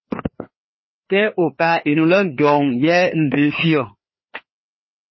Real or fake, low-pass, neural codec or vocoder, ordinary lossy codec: fake; 7.2 kHz; codec, 16 kHz, 2 kbps, FreqCodec, larger model; MP3, 24 kbps